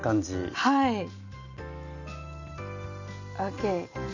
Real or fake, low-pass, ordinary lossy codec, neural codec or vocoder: real; 7.2 kHz; none; none